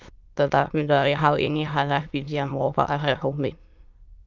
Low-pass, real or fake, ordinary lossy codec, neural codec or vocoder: 7.2 kHz; fake; Opus, 24 kbps; autoencoder, 22.05 kHz, a latent of 192 numbers a frame, VITS, trained on many speakers